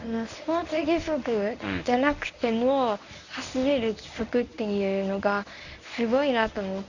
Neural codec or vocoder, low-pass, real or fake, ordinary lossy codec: codec, 24 kHz, 0.9 kbps, WavTokenizer, medium speech release version 1; 7.2 kHz; fake; none